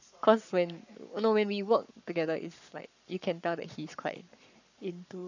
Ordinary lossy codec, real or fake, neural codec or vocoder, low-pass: none; fake; codec, 44.1 kHz, 7.8 kbps, Pupu-Codec; 7.2 kHz